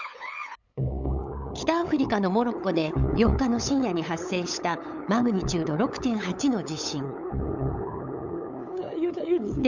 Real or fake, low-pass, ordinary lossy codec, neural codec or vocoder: fake; 7.2 kHz; none; codec, 16 kHz, 8 kbps, FunCodec, trained on LibriTTS, 25 frames a second